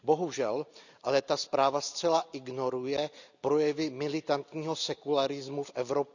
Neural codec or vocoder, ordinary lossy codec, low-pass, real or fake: none; none; 7.2 kHz; real